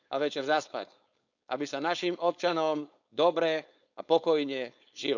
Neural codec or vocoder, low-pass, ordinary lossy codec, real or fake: codec, 16 kHz, 4.8 kbps, FACodec; 7.2 kHz; none; fake